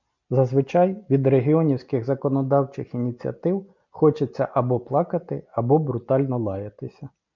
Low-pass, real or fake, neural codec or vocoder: 7.2 kHz; real; none